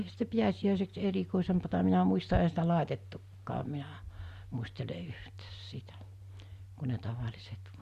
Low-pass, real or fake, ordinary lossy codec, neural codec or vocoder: 14.4 kHz; real; Opus, 64 kbps; none